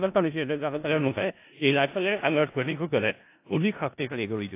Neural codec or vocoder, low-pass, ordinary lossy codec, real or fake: codec, 16 kHz in and 24 kHz out, 0.4 kbps, LongCat-Audio-Codec, four codebook decoder; 3.6 kHz; AAC, 24 kbps; fake